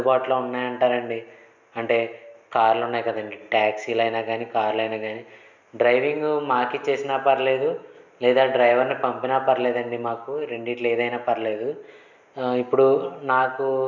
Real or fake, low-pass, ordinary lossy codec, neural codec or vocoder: real; 7.2 kHz; none; none